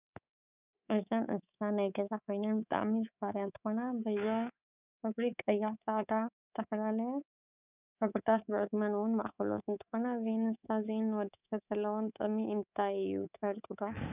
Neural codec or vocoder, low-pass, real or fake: codec, 24 kHz, 3.1 kbps, DualCodec; 3.6 kHz; fake